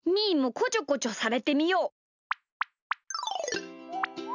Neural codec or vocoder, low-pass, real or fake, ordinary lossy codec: none; 7.2 kHz; real; none